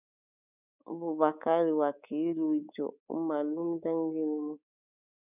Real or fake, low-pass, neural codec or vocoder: fake; 3.6 kHz; codec, 24 kHz, 3.1 kbps, DualCodec